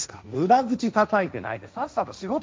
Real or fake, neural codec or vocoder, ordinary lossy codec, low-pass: fake; codec, 16 kHz, 1.1 kbps, Voila-Tokenizer; none; none